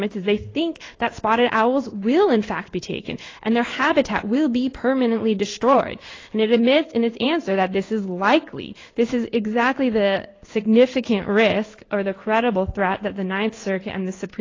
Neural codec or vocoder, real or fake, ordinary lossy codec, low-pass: codec, 16 kHz in and 24 kHz out, 1 kbps, XY-Tokenizer; fake; AAC, 32 kbps; 7.2 kHz